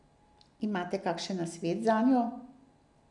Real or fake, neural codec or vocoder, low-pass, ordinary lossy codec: real; none; 10.8 kHz; none